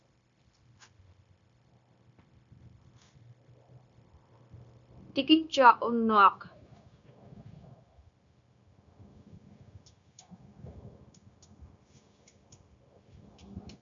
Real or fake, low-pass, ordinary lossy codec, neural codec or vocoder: fake; 7.2 kHz; MP3, 64 kbps; codec, 16 kHz, 0.9 kbps, LongCat-Audio-Codec